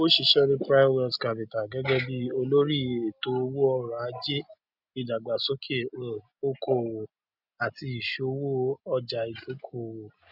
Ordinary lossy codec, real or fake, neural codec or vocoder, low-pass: none; real; none; 5.4 kHz